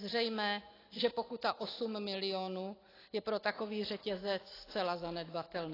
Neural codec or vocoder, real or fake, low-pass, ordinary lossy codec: none; real; 5.4 kHz; AAC, 24 kbps